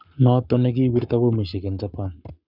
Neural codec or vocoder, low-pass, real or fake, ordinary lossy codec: codec, 44.1 kHz, 7.8 kbps, Pupu-Codec; 5.4 kHz; fake; Opus, 64 kbps